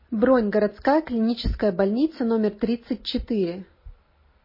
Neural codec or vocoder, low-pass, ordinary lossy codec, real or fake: none; 5.4 kHz; MP3, 24 kbps; real